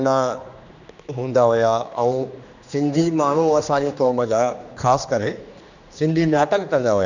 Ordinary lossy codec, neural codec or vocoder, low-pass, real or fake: none; codec, 16 kHz, 2 kbps, X-Codec, HuBERT features, trained on general audio; 7.2 kHz; fake